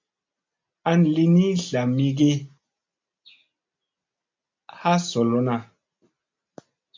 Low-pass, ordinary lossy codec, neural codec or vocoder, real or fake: 7.2 kHz; MP3, 64 kbps; none; real